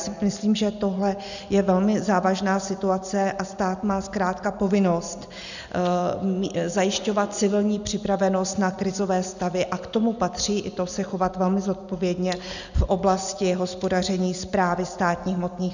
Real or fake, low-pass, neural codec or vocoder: real; 7.2 kHz; none